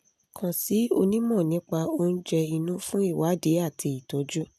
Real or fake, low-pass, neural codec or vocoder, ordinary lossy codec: real; 14.4 kHz; none; none